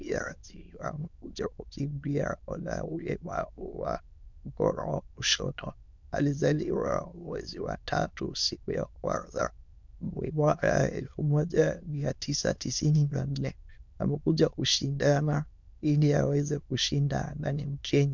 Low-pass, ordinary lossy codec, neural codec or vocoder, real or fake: 7.2 kHz; MP3, 64 kbps; autoencoder, 22.05 kHz, a latent of 192 numbers a frame, VITS, trained on many speakers; fake